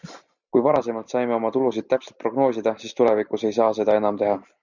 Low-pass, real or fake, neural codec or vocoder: 7.2 kHz; real; none